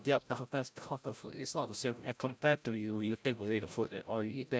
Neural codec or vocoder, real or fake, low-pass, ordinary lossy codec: codec, 16 kHz, 0.5 kbps, FreqCodec, larger model; fake; none; none